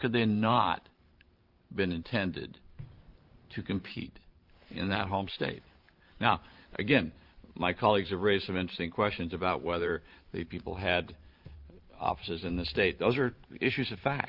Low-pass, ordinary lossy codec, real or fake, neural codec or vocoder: 5.4 kHz; Opus, 32 kbps; fake; vocoder, 44.1 kHz, 128 mel bands every 512 samples, BigVGAN v2